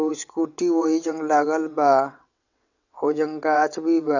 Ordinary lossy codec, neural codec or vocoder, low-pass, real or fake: none; vocoder, 22.05 kHz, 80 mel bands, WaveNeXt; 7.2 kHz; fake